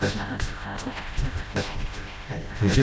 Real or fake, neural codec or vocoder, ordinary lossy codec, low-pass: fake; codec, 16 kHz, 0.5 kbps, FreqCodec, smaller model; none; none